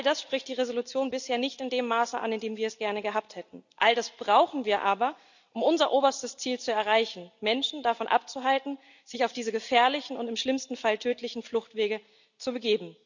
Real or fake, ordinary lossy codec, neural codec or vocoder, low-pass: real; none; none; 7.2 kHz